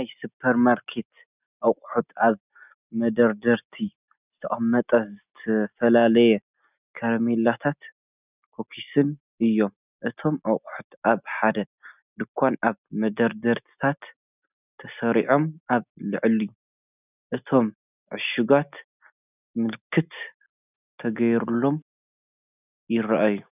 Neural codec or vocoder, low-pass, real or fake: none; 3.6 kHz; real